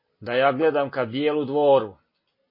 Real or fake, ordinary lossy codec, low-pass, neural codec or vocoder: real; MP3, 24 kbps; 5.4 kHz; none